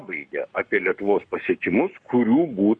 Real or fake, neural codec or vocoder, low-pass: fake; codec, 44.1 kHz, 7.8 kbps, Pupu-Codec; 9.9 kHz